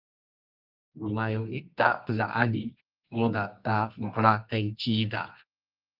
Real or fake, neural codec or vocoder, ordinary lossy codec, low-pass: fake; codec, 24 kHz, 0.9 kbps, WavTokenizer, medium music audio release; Opus, 32 kbps; 5.4 kHz